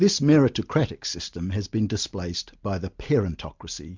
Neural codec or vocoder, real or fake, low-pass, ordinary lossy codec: none; real; 7.2 kHz; MP3, 64 kbps